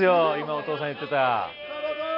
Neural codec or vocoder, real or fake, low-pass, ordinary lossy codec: none; real; 5.4 kHz; MP3, 32 kbps